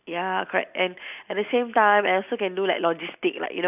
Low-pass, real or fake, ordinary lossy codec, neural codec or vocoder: 3.6 kHz; real; none; none